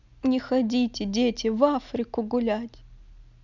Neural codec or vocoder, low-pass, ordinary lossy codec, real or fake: none; 7.2 kHz; none; real